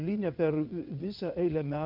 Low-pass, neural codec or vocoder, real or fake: 5.4 kHz; vocoder, 22.05 kHz, 80 mel bands, WaveNeXt; fake